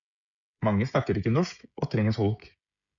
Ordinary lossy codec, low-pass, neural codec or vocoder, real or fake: MP3, 96 kbps; 7.2 kHz; codec, 16 kHz, 16 kbps, FreqCodec, smaller model; fake